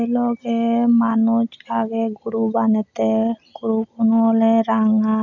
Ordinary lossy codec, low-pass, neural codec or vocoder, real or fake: none; 7.2 kHz; none; real